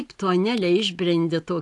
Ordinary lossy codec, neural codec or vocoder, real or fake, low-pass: AAC, 64 kbps; none; real; 10.8 kHz